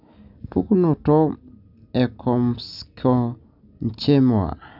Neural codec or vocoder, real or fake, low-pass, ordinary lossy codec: none; real; 5.4 kHz; none